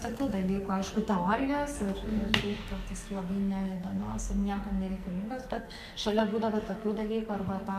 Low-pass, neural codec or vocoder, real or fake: 14.4 kHz; codec, 44.1 kHz, 2.6 kbps, SNAC; fake